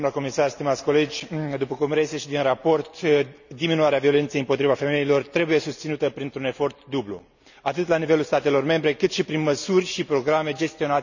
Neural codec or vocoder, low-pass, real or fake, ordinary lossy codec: none; 7.2 kHz; real; none